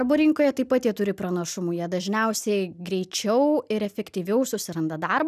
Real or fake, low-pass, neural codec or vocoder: real; 14.4 kHz; none